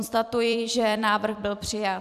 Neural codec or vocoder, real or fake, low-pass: vocoder, 44.1 kHz, 128 mel bands every 256 samples, BigVGAN v2; fake; 14.4 kHz